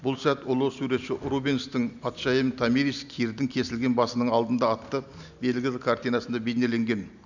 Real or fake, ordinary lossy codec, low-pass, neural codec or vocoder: real; none; 7.2 kHz; none